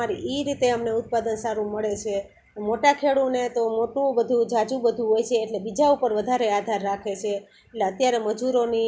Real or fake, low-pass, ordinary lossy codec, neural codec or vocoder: real; none; none; none